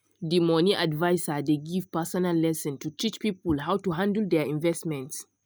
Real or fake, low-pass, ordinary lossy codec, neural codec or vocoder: real; none; none; none